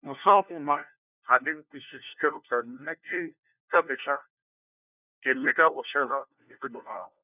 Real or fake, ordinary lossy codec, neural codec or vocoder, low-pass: fake; none; codec, 16 kHz, 1 kbps, FunCodec, trained on LibriTTS, 50 frames a second; 3.6 kHz